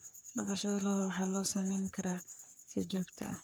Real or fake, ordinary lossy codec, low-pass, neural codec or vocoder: fake; none; none; codec, 44.1 kHz, 3.4 kbps, Pupu-Codec